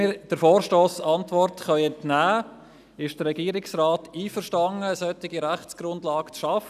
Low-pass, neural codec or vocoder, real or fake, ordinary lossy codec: 14.4 kHz; none; real; none